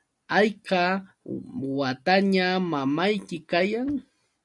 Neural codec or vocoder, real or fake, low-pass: none; real; 10.8 kHz